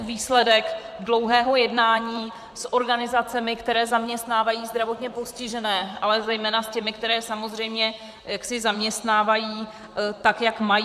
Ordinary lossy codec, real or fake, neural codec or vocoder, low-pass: MP3, 96 kbps; fake; vocoder, 44.1 kHz, 128 mel bands, Pupu-Vocoder; 14.4 kHz